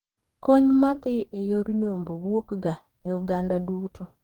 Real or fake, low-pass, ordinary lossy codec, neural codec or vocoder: fake; 19.8 kHz; Opus, 24 kbps; codec, 44.1 kHz, 2.6 kbps, DAC